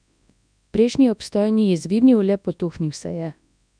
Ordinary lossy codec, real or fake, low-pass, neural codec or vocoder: none; fake; 9.9 kHz; codec, 24 kHz, 0.9 kbps, WavTokenizer, large speech release